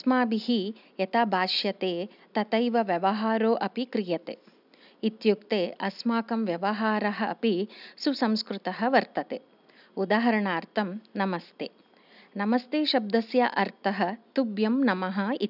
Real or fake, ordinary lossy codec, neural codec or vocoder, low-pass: real; none; none; 5.4 kHz